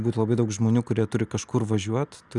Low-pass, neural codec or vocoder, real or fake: 10.8 kHz; none; real